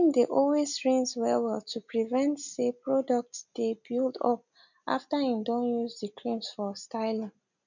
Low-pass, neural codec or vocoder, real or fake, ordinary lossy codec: 7.2 kHz; none; real; none